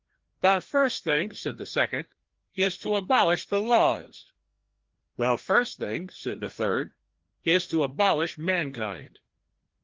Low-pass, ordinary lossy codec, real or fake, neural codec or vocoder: 7.2 kHz; Opus, 16 kbps; fake; codec, 16 kHz, 1 kbps, FreqCodec, larger model